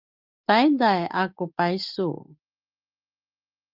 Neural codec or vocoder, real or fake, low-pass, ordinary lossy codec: none; real; 5.4 kHz; Opus, 32 kbps